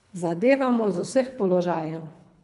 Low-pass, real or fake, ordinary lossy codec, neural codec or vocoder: 10.8 kHz; fake; none; codec, 24 kHz, 3 kbps, HILCodec